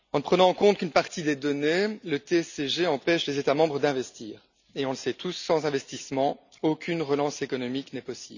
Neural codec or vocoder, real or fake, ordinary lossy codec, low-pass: none; real; none; 7.2 kHz